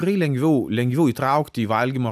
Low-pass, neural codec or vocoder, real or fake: 14.4 kHz; none; real